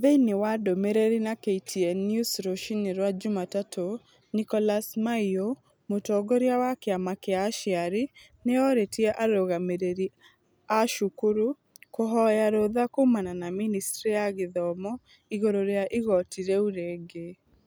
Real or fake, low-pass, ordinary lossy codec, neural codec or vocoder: real; none; none; none